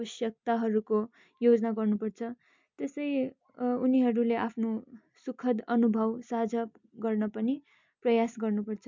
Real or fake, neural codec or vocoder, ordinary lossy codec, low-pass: real; none; MP3, 64 kbps; 7.2 kHz